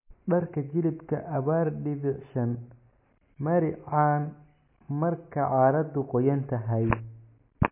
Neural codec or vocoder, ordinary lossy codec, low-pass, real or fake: none; MP3, 24 kbps; 3.6 kHz; real